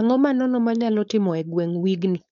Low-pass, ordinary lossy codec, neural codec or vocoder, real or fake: 7.2 kHz; none; codec, 16 kHz, 4.8 kbps, FACodec; fake